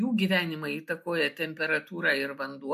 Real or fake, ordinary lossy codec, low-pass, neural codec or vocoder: real; MP3, 64 kbps; 14.4 kHz; none